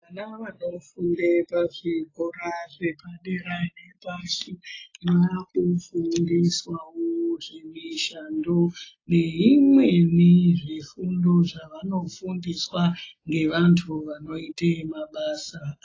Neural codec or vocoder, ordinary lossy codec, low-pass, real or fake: none; AAC, 32 kbps; 7.2 kHz; real